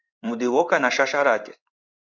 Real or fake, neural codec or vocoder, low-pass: fake; codec, 16 kHz in and 24 kHz out, 1 kbps, XY-Tokenizer; 7.2 kHz